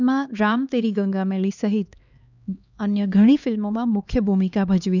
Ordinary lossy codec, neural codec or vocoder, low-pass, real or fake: none; codec, 16 kHz, 2 kbps, X-Codec, HuBERT features, trained on LibriSpeech; 7.2 kHz; fake